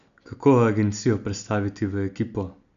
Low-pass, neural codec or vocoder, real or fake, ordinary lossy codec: 7.2 kHz; none; real; none